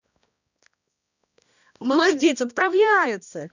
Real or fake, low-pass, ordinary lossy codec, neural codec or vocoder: fake; 7.2 kHz; none; codec, 16 kHz, 1 kbps, X-Codec, HuBERT features, trained on balanced general audio